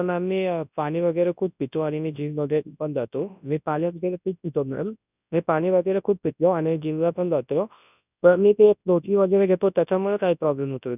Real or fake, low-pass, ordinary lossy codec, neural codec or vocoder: fake; 3.6 kHz; none; codec, 24 kHz, 0.9 kbps, WavTokenizer, large speech release